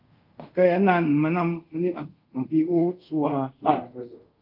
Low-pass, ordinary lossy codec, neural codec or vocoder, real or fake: 5.4 kHz; Opus, 32 kbps; codec, 24 kHz, 0.5 kbps, DualCodec; fake